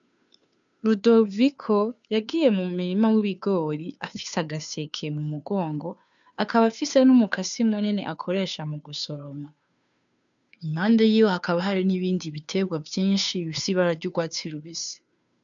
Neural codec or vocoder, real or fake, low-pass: codec, 16 kHz, 2 kbps, FunCodec, trained on Chinese and English, 25 frames a second; fake; 7.2 kHz